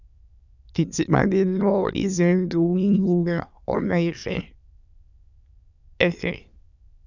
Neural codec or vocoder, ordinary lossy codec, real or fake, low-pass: autoencoder, 22.05 kHz, a latent of 192 numbers a frame, VITS, trained on many speakers; none; fake; 7.2 kHz